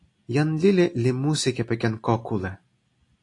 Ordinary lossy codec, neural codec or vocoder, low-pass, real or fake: AAC, 48 kbps; none; 10.8 kHz; real